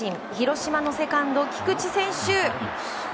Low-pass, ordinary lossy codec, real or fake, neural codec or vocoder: none; none; real; none